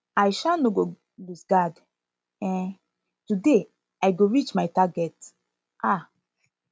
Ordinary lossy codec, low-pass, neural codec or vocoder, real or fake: none; none; none; real